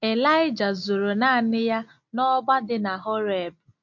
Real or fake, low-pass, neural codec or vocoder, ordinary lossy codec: real; 7.2 kHz; none; MP3, 48 kbps